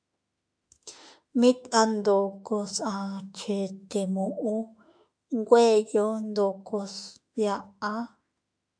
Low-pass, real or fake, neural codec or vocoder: 9.9 kHz; fake; autoencoder, 48 kHz, 32 numbers a frame, DAC-VAE, trained on Japanese speech